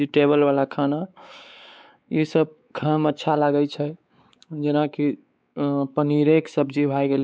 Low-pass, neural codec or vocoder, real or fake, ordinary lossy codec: none; codec, 16 kHz, 4 kbps, X-Codec, WavLM features, trained on Multilingual LibriSpeech; fake; none